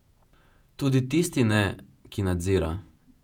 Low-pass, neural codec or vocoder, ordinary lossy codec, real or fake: 19.8 kHz; vocoder, 48 kHz, 128 mel bands, Vocos; none; fake